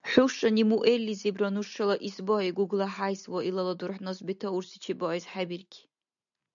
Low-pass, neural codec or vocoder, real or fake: 7.2 kHz; none; real